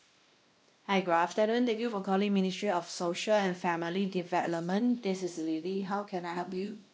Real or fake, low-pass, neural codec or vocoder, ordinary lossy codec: fake; none; codec, 16 kHz, 1 kbps, X-Codec, WavLM features, trained on Multilingual LibriSpeech; none